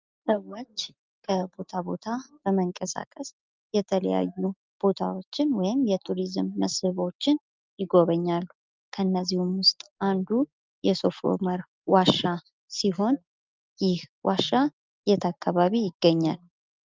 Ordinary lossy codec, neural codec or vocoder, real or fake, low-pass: Opus, 24 kbps; none; real; 7.2 kHz